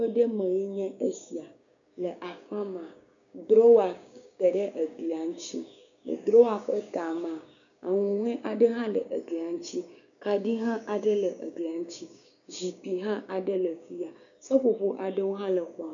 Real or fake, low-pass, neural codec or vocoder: fake; 7.2 kHz; codec, 16 kHz, 6 kbps, DAC